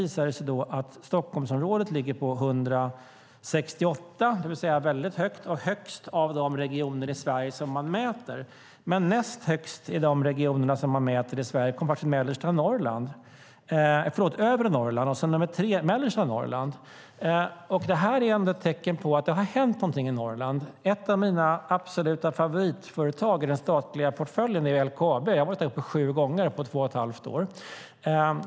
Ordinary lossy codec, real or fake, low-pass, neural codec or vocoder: none; real; none; none